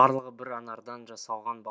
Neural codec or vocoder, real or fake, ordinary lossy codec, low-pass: none; real; none; none